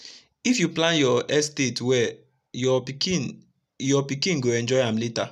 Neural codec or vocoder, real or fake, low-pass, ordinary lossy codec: none; real; 14.4 kHz; none